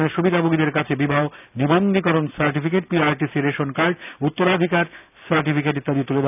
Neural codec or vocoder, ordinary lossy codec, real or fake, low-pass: none; none; real; 3.6 kHz